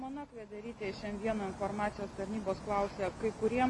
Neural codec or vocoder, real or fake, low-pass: none; real; 10.8 kHz